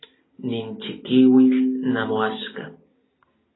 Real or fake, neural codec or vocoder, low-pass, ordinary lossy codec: real; none; 7.2 kHz; AAC, 16 kbps